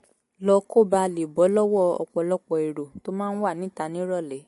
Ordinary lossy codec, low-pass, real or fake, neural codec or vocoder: MP3, 48 kbps; 14.4 kHz; real; none